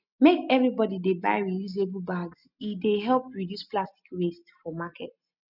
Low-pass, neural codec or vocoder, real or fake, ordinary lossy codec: 5.4 kHz; none; real; none